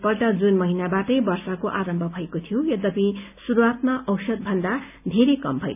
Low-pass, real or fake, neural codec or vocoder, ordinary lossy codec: 3.6 kHz; real; none; none